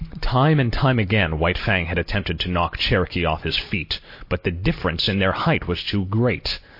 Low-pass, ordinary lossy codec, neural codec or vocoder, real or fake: 5.4 kHz; MP3, 32 kbps; none; real